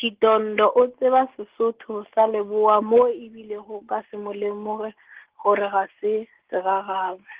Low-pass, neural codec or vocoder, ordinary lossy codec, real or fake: 3.6 kHz; none; Opus, 16 kbps; real